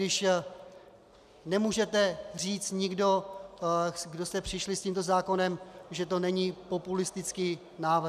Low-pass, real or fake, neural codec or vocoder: 14.4 kHz; real; none